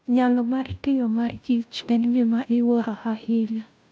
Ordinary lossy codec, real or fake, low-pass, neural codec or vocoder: none; fake; none; codec, 16 kHz, 0.5 kbps, FunCodec, trained on Chinese and English, 25 frames a second